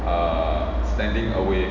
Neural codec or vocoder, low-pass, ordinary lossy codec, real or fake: none; 7.2 kHz; none; real